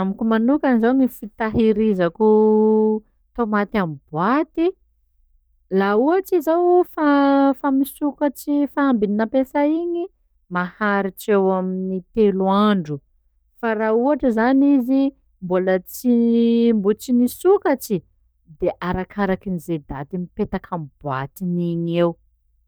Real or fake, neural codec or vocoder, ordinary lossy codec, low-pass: fake; codec, 44.1 kHz, 7.8 kbps, DAC; none; none